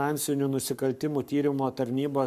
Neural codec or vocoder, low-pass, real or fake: codec, 44.1 kHz, 7.8 kbps, Pupu-Codec; 14.4 kHz; fake